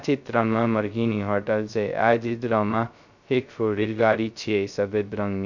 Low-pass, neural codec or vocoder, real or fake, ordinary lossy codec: 7.2 kHz; codec, 16 kHz, 0.2 kbps, FocalCodec; fake; none